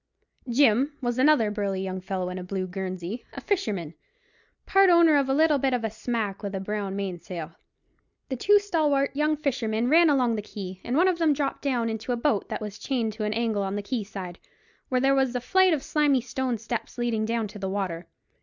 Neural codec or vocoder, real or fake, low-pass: none; real; 7.2 kHz